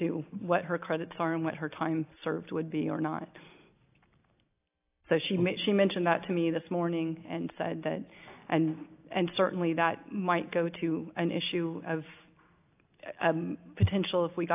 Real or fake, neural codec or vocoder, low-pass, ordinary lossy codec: real; none; 3.6 kHz; AAC, 32 kbps